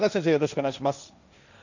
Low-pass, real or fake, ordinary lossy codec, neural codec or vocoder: 7.2 kHz; fake; none; codec, 16 kHz, 1.1 kbps, Voila-Tokenizer